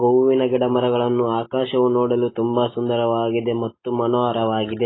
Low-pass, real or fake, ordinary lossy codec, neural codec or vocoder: 7.2 kHz; real; AAC, 16 kbps; none